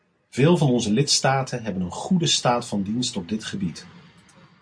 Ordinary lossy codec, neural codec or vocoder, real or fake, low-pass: MP3, 48 kbps; none; real; 9.9 kHz